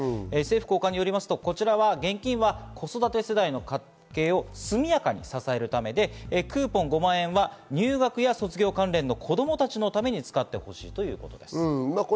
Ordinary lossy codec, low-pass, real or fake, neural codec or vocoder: none; none; real; none